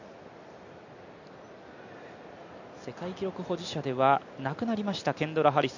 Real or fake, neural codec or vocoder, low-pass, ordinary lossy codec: real; none; 7.2 kHz; none